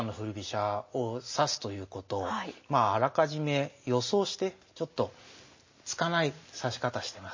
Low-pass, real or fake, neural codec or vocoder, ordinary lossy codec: 7.2 kHz; real; none; MP3, 32 kbps